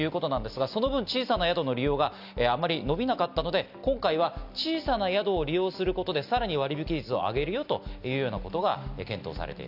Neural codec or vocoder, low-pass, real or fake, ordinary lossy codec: none; 5.4 kHz; real; none